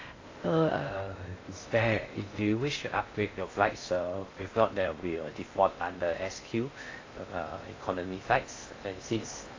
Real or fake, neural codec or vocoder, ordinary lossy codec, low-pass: fake; codec, 16 kHz in and 24 kHz out, 0.6 kbps, FocalCodec, streaming, 2048 codes; AAC, 32 kbps; 7.2 kHz